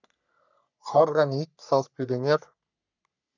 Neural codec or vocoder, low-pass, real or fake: codec, 44.1 kHz, 3.4 kbps, Pupu-Codec; 7.2 kHz; fake